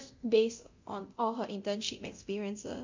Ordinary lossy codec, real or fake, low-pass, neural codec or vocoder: MP3, 64 kbps; fake; 7.2 kHz; codec, 24 kHz, 0.9 kbps, DualCodec